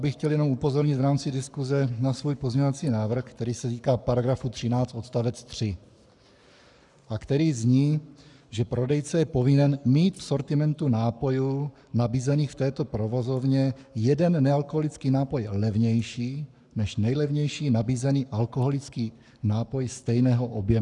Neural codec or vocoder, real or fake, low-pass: codec, 44.1 kHz, 7.8 kbps, Pupu-Codec; fake; 10.8 kHz